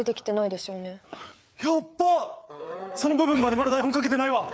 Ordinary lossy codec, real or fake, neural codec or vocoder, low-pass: none; fake; codec, 16 kHz, 4 kbps, FreqCodec, larger model; none